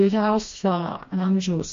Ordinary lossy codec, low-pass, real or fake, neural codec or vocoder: MP3, 48 kbps; 7.2 kHz; fake; codec, 16 kHz, 1 kbps, FreqCodec, smaller model